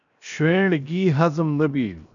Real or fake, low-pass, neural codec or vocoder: fake; 7.2 kHz; codec, 16 kHz, 0.7 kbps, FocalCodec